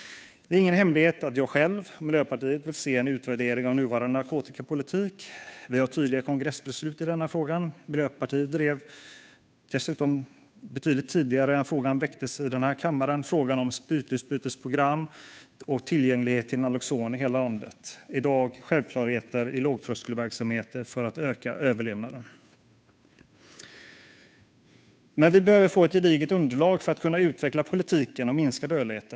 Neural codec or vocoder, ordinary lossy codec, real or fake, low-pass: codec, 16 kHz, 2 kbps, FunCodec, trained on Chinese and English, 25 frames a second; none; fake; none